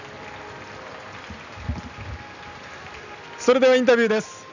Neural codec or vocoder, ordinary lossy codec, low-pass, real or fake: none; none; 7.2 kHz; real